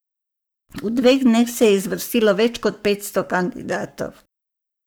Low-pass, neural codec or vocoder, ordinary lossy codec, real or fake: none; codec, 44.1 kHz, 7.8 kbps, Pupu-Codec; none; fake